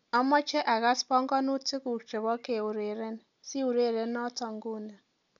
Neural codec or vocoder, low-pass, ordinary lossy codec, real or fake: none; 7.2 kHz; MP3, 64 kbps; real